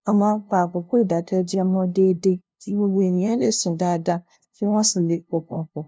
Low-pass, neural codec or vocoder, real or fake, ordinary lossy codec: none; codec, 16 kHz, 0.5 kbps, FunCodec, trained on LibriTTS, 25 frames a second; fake; none